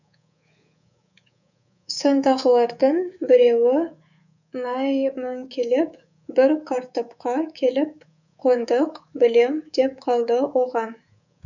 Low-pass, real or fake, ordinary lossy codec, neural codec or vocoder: 7.2 kHz; fake; none; codec, 24 kHz, 3.1 kbps, DualCodec